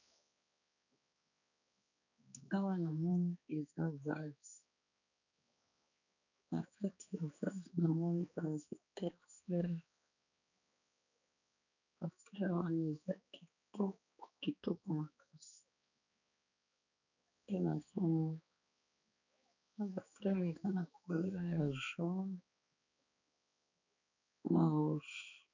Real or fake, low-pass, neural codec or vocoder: fake; 7.2 kHz; codec, 16 kHz, 2 kbps, X-Codec, HuBERT features, trained on balanced general audio